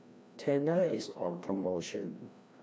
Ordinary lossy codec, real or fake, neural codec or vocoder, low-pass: none; fake; codec, 16 kHz, 1 kbps, FreqCodec, larger model; none